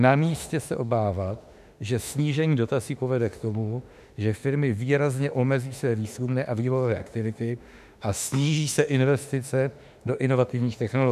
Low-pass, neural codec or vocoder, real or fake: 14.4 kHz; autoencoder, 48 kHz, 32 numbers a frame, DAC-VAE, trained on Japanese speech; fake